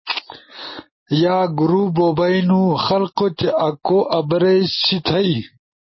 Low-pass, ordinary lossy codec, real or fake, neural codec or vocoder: 7.2 kHz; MP3, 24 kbps; real; none